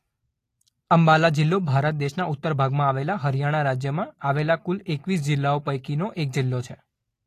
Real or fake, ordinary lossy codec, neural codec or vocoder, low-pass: real; AAC, 48 kbps; none; 14.4 kHz